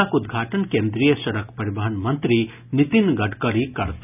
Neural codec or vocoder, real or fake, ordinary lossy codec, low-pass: none; real; none; 3.6 kHz